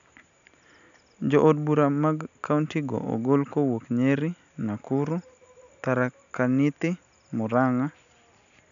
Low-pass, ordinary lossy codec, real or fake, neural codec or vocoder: 7.2 kHz; none; real; none